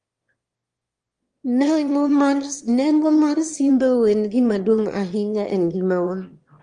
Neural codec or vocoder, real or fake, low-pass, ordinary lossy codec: autoencoder, 22.05 kHz, a latent of 192 numbers a frame, VITS, trained on one speaker; fake; 9.9 kHz; Opus, 24 kbps